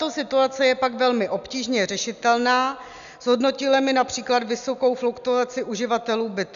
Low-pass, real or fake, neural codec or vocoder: 7.2 kHz; real; none